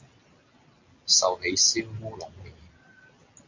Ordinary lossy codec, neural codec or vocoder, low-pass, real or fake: MP3, 48 kbps; none; 7.2 kHz; real